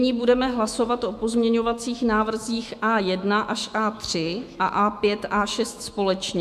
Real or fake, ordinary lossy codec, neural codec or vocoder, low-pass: fake; AAC, 96 kbps; autoencoder, 48 kHz, 128 numbers a frame, DAC-VAE, trained on Japanese speech; 14.4 kHz